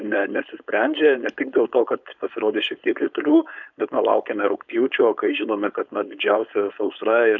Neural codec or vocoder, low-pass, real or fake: codec, 16 kHz, 4.8 kbps, FACodec; 7.2 kHz; fake